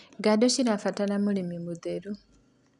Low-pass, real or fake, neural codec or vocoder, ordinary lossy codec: 10.8 kHz; real; none; none